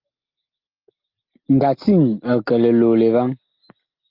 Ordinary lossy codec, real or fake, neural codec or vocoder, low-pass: Opus, 32 kbps; real; none; 5.4 kHz